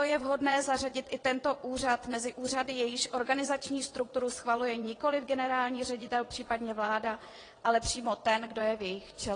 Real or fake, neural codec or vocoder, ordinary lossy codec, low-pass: fake; vocoder, 22.05 kHz, 80 mel bands, WaveNeXt; AAC, 32 kbps; 9.9 kHz